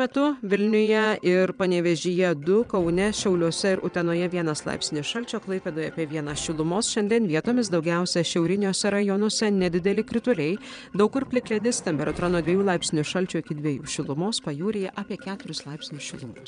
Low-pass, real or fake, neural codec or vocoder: 9.9 kHz; fake; vocoder, 22.05 kHz, 80 mel bands, Vocos